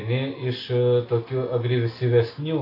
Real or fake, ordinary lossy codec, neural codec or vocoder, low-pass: real; AAC, 24 kbps; none; 5.4 kHz